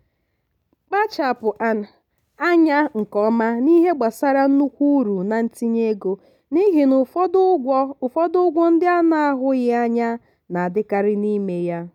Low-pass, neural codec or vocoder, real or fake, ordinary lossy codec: 19.8 kHz; none; real; none